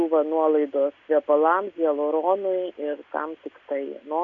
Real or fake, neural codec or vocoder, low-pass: real; none; 7.2 kHz